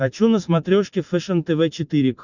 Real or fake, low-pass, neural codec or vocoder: real; 7.2 kHz; none